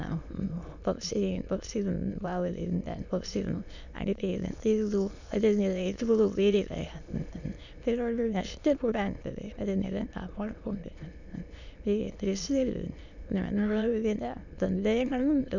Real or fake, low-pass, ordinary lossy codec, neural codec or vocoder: fake; 7.2 kHz; none; autoencoder, 22.05 kHz, a latent of 192 numbers a frame, VITS, trained on many speakers